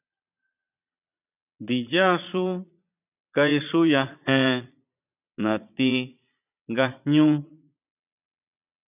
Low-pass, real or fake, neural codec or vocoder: 3.6 kHz; fake; vocoder, 22.05 kHz, 80 mel bands, Vocos